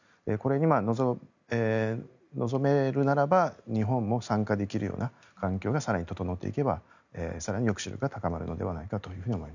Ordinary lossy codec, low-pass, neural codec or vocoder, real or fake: none; 7.2 kHz; none; real